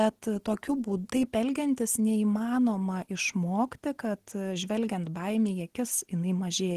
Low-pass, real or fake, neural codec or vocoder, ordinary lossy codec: 14.4 kHz; real; none; Opus, 16 kbps